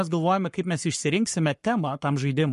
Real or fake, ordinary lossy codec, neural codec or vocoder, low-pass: fake; MP3, 48 kbps; codec, 44.1 kHz, 7.8 kbps, Pupu-Codec; 14.4 kHz